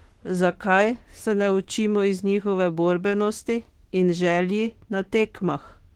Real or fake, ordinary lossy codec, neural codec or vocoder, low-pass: fake; Opus, 16 kbps; autoencoder, 48 kHz, 32 numbers a frame, DAC-VAE, trained on Japanese speech; 19.8 kHz